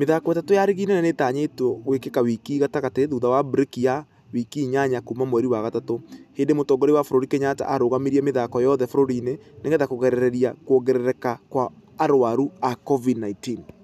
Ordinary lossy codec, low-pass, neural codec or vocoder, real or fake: none; 14.4 kHz; none; real